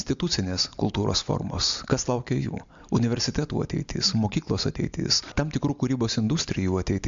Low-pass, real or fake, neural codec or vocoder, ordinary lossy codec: 7.2 kHz; real; none; MP3, 64 kbps